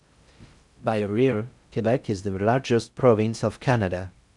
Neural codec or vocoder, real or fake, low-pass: codec, 16 kHz in and 24 kHz out, 0.6 kbps, FocalCodec, streaming, 4096 codes; fake; 10.8 kHz